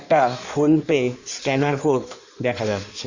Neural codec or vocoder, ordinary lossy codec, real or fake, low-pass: codec, 16 kHz in and 24 kHz out, 1.1 kbps, FireRedTTS-2 codec; Opus, 64 kbps; fake; 7.2 kHz